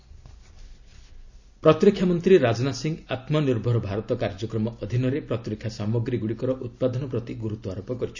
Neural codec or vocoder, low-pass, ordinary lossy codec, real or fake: none; 7.2 kHz; none; real